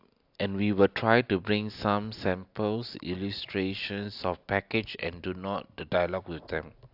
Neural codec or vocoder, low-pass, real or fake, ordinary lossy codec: none; 5.4 kHz; real; AAC, 48 kbps